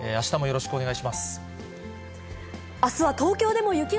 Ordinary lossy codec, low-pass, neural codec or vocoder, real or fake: none; none; none; real